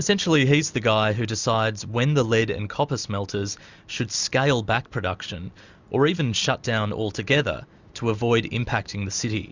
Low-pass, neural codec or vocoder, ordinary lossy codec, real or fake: 7.2 kHz; none; Opus, 64 kbps; real